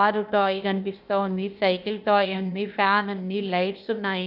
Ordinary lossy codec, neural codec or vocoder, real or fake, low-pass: none; codec, 16 kHz, 0.8 kbps, ZipCodec; fake; 5.4 kHz